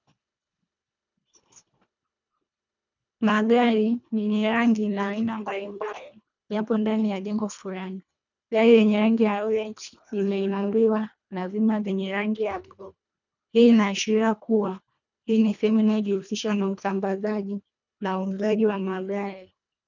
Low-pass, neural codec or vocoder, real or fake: 7.2 kHz; codec, 24 kHz, 1.5 kbps, HILCodec; fake